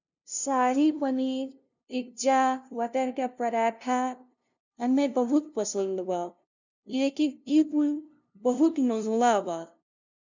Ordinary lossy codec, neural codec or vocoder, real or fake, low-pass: none; codec, 16 kHz, 0.5 kbps, FunCodec, trained on LibriTTS, 25 frames a second; fake; 7.2 kHz